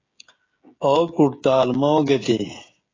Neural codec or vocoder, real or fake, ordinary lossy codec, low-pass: codec, 16 kHz, 16 kbps, FreqCodec, smaller model; fake; AAC, 32 kbps; 7.2 kHz